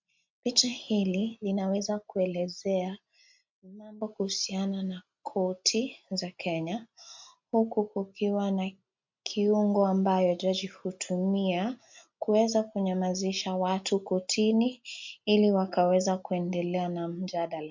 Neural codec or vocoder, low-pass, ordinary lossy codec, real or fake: none; 7.2 kHz; MP3, 64 kbps; real